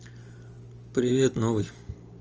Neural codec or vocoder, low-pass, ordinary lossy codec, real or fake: none; 7.2 kHz; Opus, 24 kbps; real